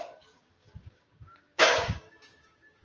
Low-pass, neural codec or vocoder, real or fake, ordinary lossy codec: 7.2 kHz; codec, 16 kHz, 6 kbps, DAC; fake; Opus, 24 kbps